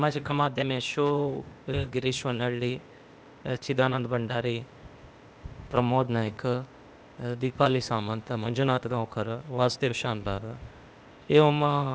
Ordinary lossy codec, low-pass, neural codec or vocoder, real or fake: none; none; codec, 16 kHz, 0.8 kbps, ZipCodec; fake